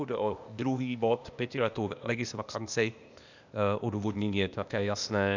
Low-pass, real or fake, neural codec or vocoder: 7.2 kHz; fake; codec, 16 kHz, 0.8 kbps, ZipCodec